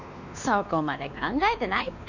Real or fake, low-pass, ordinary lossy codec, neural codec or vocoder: fake; 7.2 kHz; none; codec, 16 kHz, 2 kbps, X-Codec, WavLM features, trained on Multilingual LibriSpeech